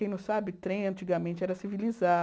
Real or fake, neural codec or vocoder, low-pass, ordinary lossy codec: real; none; none; none